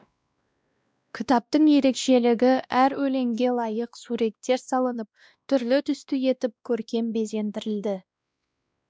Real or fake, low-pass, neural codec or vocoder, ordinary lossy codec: fake; none; codec, 16 kHz, 1 kbps, X-Codec, WavLM features, trained on Multilingual LibriSpeech; none